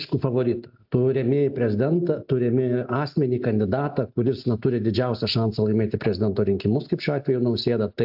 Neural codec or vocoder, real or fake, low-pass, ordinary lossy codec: none; real; 5.4 kHz; AAC, 48 kbps